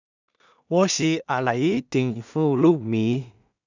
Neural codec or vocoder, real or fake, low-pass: codec, 16 kHz in and 24 kHz out, 0.4 kbps, LongCat-Audio-Codec, two codebook decoder; fake; 7.2 kHz